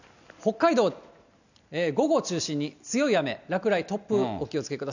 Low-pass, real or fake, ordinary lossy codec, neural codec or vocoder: 7.2 kHz; real; none; none